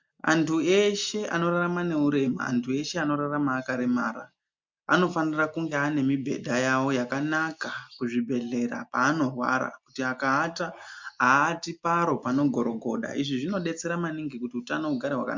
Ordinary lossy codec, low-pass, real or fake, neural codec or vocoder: MP3, 64 kbps; 7.2 kHz; real; none